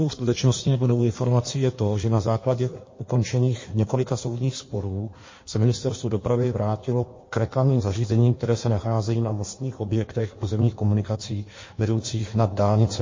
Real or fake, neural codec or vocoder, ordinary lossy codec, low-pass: fake; codec, 16 kHz in and 24 kHz out, 1.1 kbps, FireRedTTS-2 codec; MP3, 32 kbps; 7.2 kHz